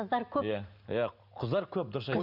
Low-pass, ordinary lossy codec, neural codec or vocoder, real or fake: 5.4 kHz; none; none; real